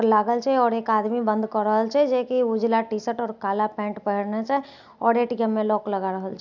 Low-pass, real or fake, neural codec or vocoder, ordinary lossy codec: 7.2 kHz; real; none; none